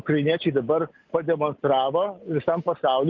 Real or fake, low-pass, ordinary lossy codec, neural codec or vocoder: real; 7.2 kHz; Opus, 32 kbps; none